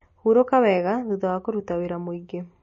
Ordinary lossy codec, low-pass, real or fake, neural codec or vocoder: MP3, 32 kbps; 7.2 kHz; real; none